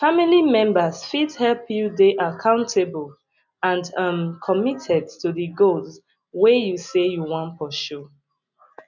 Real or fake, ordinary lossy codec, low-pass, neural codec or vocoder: real; none; 7.2 kHz; none